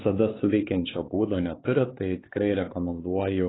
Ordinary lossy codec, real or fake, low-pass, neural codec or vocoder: AAC, 16 kbps; fake; 7.2 kHz; codec, 16 kHz, 4 kbps, X-Codec, HuBERT features, trained on LibriSpeech